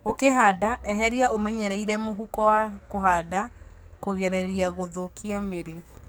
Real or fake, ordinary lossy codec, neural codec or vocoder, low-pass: fake; none; codec, 44.1 kHz, 2.6 kbps, SNAC; none